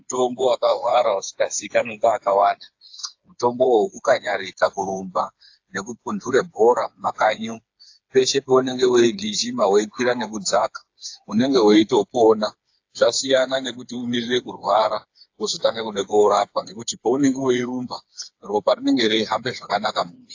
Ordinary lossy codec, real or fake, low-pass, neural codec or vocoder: AAC, 48 kbps; fake; 7.2 kHz; codec, 16 kHz, 4 kbps, FreqCodec, smaller model